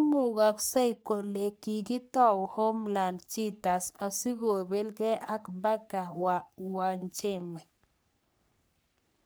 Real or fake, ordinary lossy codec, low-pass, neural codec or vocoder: fake; none; none; codec, 44.1 kHz, 3.4 kbps, Pupu-Codec